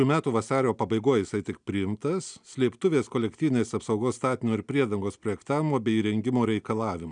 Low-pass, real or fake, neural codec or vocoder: 9.9 kHz; real; none